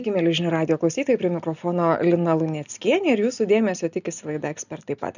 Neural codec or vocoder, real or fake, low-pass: none; real; 7.2 kHz